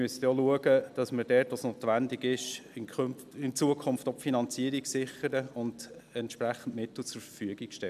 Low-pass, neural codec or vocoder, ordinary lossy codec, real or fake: 14.4 kHz; none; none; real